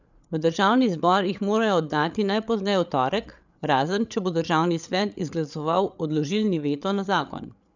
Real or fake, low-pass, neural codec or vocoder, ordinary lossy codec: fake; 7.2 kHz; codec, 16 kHz, 8 kbps, FreqCodec, larger model; none